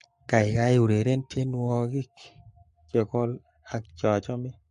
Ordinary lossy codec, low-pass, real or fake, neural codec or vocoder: MP3, 48 kbps; 14.4 kHz; fake; codec, 44.1 kHz, 7.8 kbps, Pupu-Codec